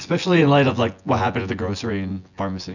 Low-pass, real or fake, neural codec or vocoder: 7.2 kHz; fake; vocoder, 24 kHz, 100 mel bands, Vocos